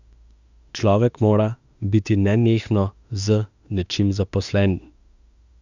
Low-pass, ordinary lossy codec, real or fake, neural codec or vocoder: 7.2 kHz; none; fake; autoencoder, 48 kHz, 32 numbers a frame, DAC-VAE, trained on Japanese speech